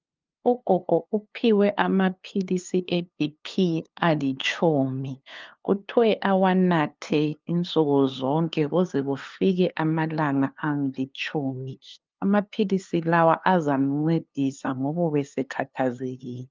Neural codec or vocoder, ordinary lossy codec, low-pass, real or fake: codec, 16 kHz, 2 kbps, FunCodec, trained on LibriTTS, 25 frames a second; Opus, 32 kbps; 7.2 kHz; fake